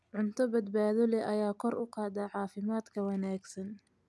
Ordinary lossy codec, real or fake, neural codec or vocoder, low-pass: none; real; none; none